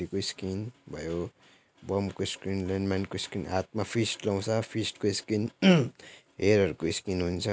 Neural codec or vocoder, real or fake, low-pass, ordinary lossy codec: none; real; none; none